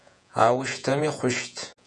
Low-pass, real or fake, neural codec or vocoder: 10.8 kHz; fake; vocoder, 48 kHz, 128 mel bands, Vocos